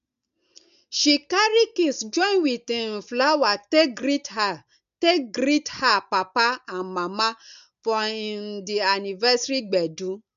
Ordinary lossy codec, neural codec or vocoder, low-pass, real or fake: none; none; 7.2 kHz; real